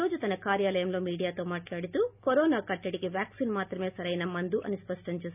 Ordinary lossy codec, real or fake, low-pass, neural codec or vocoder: none; real; 3.6 kHz; none